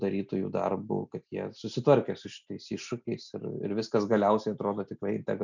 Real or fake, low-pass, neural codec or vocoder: real; 7.2 kHz; none